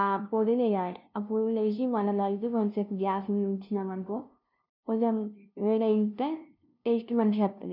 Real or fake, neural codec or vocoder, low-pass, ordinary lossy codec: fake; codec, 16 kHz, 0.5 kbps, FunCodec, trained on LibriTTS, 25 frames a second; 5.4 kHz; none